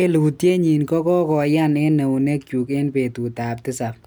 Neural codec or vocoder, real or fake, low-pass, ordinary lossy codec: none; real; none; none